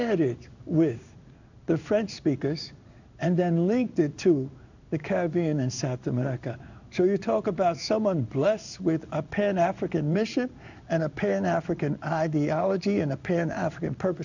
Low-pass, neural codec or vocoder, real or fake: 7.2 kHz; codec, 16 kHz in and 24 kHz out, 1 kbps, XY-Tokenizer; fake